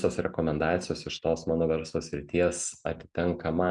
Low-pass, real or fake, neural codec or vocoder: 10.8 kHz; real; none